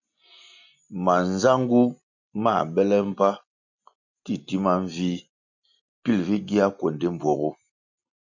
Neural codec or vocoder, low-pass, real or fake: none; 7.2 kHz; real